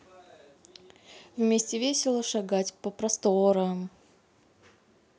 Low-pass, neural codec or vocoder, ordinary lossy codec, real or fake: none; none; none; real